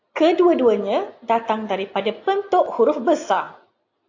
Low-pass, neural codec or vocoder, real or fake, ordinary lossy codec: 7.2 kHz; none; real; AAC, 48 kbps